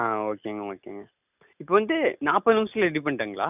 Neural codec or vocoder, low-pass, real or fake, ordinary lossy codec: none; 3.6 kHz; real; none